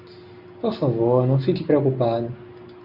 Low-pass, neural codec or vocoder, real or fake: 5.4 kHz; none; real